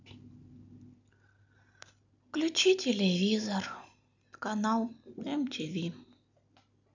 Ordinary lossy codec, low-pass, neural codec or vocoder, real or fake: none; 7.2 kHz; none; real